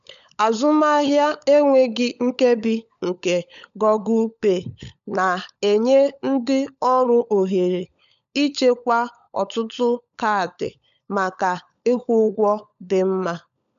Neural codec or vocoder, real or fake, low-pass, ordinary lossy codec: codec, 16 kHz, 16 kbps, FunCodec, trained on LibriTTS, 50 frames a second; fake; 7.2 kHz; none